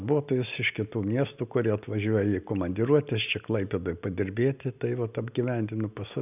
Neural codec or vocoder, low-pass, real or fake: none; 3.6 kHz; real